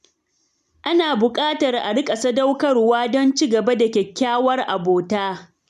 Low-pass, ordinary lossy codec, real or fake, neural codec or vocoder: 14.4 kHz; none; real; none